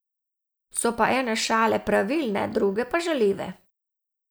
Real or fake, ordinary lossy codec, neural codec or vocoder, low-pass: real; none; none; none